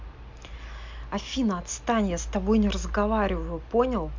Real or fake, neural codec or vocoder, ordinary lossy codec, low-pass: real; none; MP3, 64 kbps; 7.2 kHz